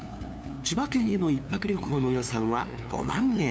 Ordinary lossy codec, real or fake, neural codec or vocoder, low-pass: none; fake; codec, 16 kHz, 2 kbps, FunCodec, trained on LibriTTS, 25 frames a second; none